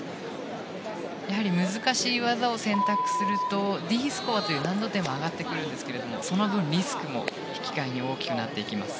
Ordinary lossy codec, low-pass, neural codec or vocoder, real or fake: none; none; none; real